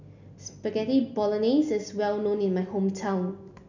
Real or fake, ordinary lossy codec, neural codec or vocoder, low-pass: real; none; none; 7.2 kHz